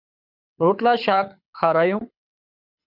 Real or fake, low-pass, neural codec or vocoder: fake; 5.4 kHz; codec, 24 kHz, 6 kbps, HILCodec